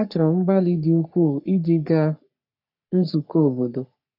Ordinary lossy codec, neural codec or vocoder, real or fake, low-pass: none; codec, 44.1 kHz, 3.4 kbps, Pupu-Codec; fake; 5.4 kHz